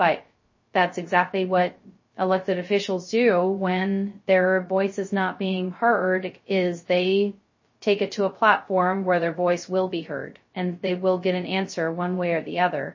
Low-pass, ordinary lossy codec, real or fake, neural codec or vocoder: 7.2 kHz; MP3, 32 kbps; fake; codec, 16 kHz, 0.2 kbps, FocalCodec